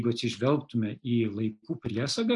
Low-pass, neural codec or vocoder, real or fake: 10.8 kHz; none; real